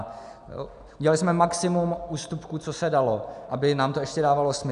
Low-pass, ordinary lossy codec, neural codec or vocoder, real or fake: 10.8 kHz; MP3, 96 kbps; none; real